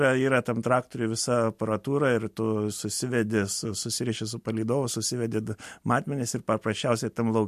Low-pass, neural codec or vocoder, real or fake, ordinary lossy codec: 14.4 kHz; none; real; MP3, 64 kbps